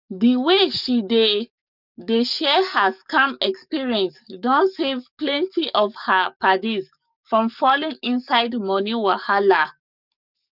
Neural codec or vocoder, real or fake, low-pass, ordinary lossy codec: codec, 44.1 kHz, 7.8 kbps, DAC; fake; 5.4 kHz; none